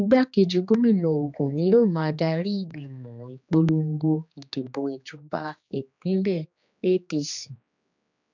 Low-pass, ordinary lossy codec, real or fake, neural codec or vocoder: 7.2 kHz; none; fake; codec, 16 kHz, 2 kbps, X-Codec, HuBERT features, trained on general audio